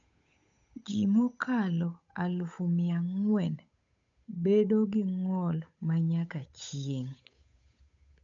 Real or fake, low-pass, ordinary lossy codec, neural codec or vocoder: fake; 7.2 kHz; MP3, 64 kbps; codec, 16 kHz, 8 kbps, FunCodec, trained on Chinese and English, 25 frames a second